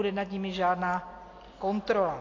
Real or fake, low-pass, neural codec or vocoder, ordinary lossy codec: real; 7.2 kHz; none; AAC, 32 kbps